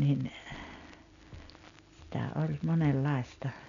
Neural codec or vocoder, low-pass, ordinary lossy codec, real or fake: none; 7.2 kHz; none; real